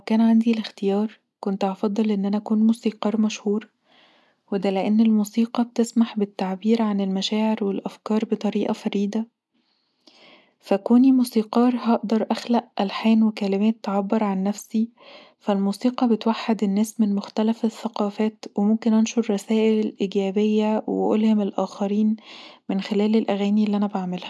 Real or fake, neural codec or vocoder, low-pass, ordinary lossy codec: real; none; none; none